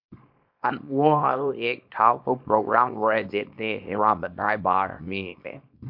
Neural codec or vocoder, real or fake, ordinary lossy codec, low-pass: codec, 24 kHz, 0.9 kbps, WavTokenizer, small release; fake; MP3, 48 kbps; 5.4 kHz